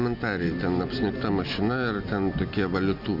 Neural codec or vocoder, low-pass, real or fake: none; 5.4 kHz; real